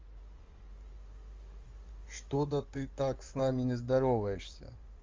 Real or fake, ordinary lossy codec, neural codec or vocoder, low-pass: fake; Opus, 32 kbps; codec, 16 kHz in and 24 kHz out, 2.2 kbps, FireRedTTS-2 codec; 7.2 kHz